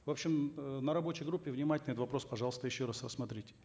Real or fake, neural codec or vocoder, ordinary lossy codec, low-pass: real; none; none; none